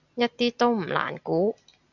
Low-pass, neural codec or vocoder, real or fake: 7.2 kHz; none; real